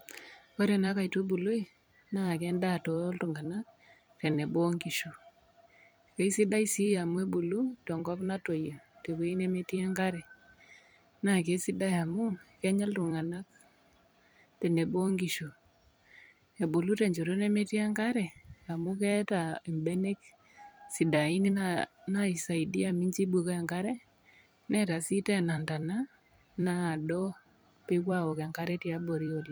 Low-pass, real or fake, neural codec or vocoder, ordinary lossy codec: none; fake; vocoder, 44.1 kHz, 128 mel bands every 256 samples, BigVGAN v2; none